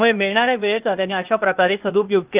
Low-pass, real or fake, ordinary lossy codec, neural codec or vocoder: 3.6 kHz; fake; Opus, 24 kbps; codec, 16 kHz, 0.8 kbps, ZipCodec